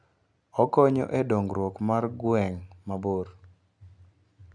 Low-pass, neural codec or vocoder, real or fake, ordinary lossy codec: 9.9 kHz; none; real; none